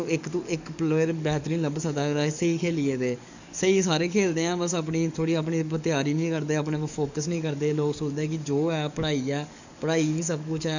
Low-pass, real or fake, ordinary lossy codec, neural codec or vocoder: 7.2 kHz; fake; none; codec, 44.1 kHz, 7.8 kbps, DAC